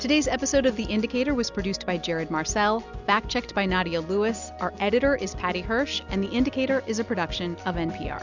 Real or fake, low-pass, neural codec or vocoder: real; 7.2 kHz; none